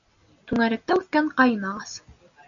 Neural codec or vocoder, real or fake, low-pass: none; real; 7.2 kHz